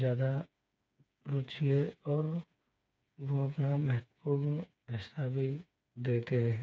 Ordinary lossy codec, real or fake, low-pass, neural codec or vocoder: none; fake; none; codec, 16 kHz, 4 kbps, FreqCodec, smaller model